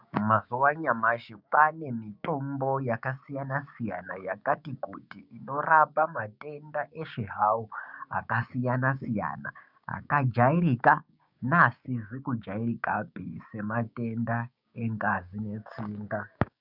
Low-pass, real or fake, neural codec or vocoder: 5.4 kHz; fake; codec, 16 kHz, 6 kbps, DAC